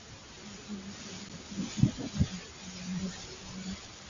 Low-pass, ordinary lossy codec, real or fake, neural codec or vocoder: 7.2 kHz; Opus, 64 kbps; real; none